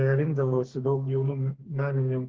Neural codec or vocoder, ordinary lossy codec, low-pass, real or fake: codec, 32 kHz, 1.9 kbps, SNAC; Opus, 16 kbps; 7.2 kHz; fake